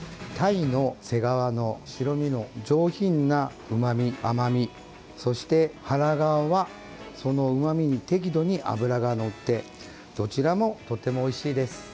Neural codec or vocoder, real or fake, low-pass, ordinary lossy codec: none; real; none; none